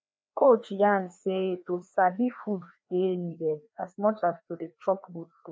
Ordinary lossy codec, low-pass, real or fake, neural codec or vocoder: none; none; fake; codec, 16 kHz, 2 kbps, FreqCodec, larger model